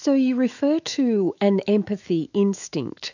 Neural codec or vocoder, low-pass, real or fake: autoencoder, 48 kHz, 128 numbers a frame, DAC-VAE, trained on Japanese speech; 7.2 kHz; fake